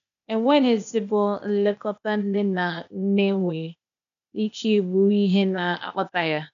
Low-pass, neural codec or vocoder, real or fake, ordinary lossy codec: 7.2 kHz; codec, 16 kHz, 0.8 kbps, ZipCodec; fake; none